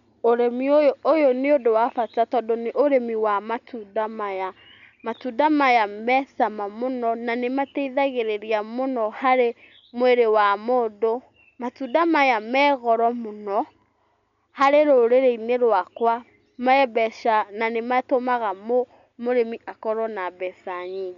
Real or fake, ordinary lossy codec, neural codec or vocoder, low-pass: real; none; none; 7.2 kHz